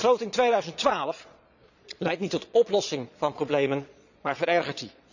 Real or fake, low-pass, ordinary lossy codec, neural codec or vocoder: fake; 7.2 kHz; none; vocoder, 22.05 kHz, 80 mel bands, Vocos